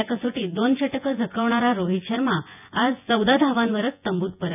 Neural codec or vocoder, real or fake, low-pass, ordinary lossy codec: vocoder, 24 kHz, 100 mel bands, Vocos; fake; 3.6 kHz; none